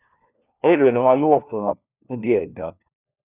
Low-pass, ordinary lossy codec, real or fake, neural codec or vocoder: 3.6 kHz; none; fake; codec, 16 kHz, 1 kbps, FunCodec, trained on LibriTTS, 50 frames a second